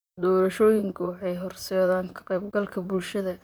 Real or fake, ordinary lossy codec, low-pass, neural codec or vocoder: fake; none; none; vocoder, 44.1 kHz, 128 mel bands, Pupu-Vocoder